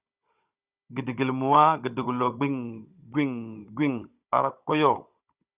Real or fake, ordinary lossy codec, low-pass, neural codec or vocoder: fake; Opus, 64 kbps; 3.6 kHz; codec, 16 kHz, 16 kbps, FunCodec, trained on Chinese and English, 50 frames a second